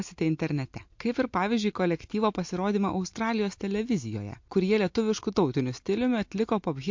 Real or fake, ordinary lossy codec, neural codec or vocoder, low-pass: real; MP3, 48 kbps; none; 7.2 kHz